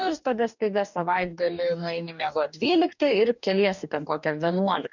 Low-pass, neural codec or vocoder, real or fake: 7.2 kHz; codec, 44.1 kHz, 2.6 kbps, DAC; fake